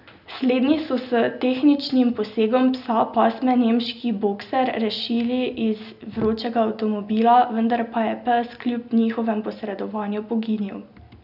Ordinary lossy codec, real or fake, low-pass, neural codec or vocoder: none; real; 5.4 kHz; none